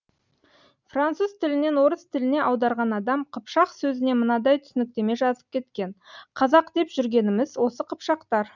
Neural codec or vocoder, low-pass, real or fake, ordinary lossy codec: none; 7.2 kHz; real; none